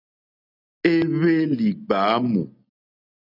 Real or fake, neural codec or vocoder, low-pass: real; none; 5.4 kHz